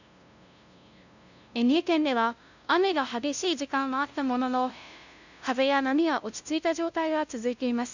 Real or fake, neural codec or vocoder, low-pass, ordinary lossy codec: fake; codec, 16 kHz, 0.5 kbps, FunCodec, trained on LibriTTS, 25 frames a second; 7.2 kHz; none